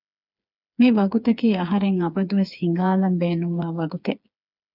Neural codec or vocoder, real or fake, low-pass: codec, 16 kHz, 4 kbps, FreqCodec, smaller model; fake; 5.4 kHz